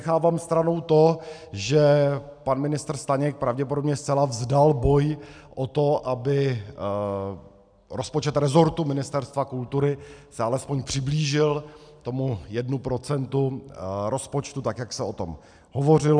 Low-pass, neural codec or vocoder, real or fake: 9.9 kHz; none; real